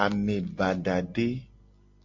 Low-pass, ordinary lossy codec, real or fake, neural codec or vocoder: 7.2 kHz; MP3, 48 kbps; real; none